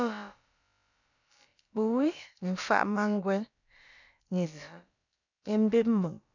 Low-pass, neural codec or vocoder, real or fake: 7.2 kHz; codec, 16 kHz, about 1 kbps, DyCAST, with the encoder's durations; fake